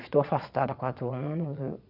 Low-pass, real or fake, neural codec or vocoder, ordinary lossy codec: 5.4 kHz; fake; vocoder, 22.05 kHz, 80 mel bands, Vocos; none